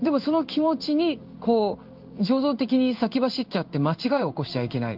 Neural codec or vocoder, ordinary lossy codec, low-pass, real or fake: codec, 16 kHz in and 24 kHz out, 1 kbps, XY-Tokenizer; Opus, 32 kbps; 5.4 kHz; fake